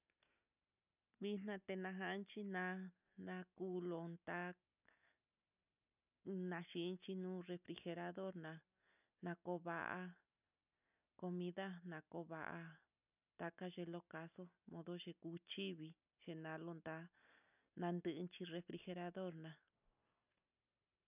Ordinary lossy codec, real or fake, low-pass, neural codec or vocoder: none; real; 3.6 kHz; none